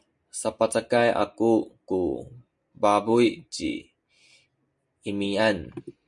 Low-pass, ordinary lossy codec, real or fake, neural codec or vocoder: 10.8 kHz; MP3, 96 kbps; real; none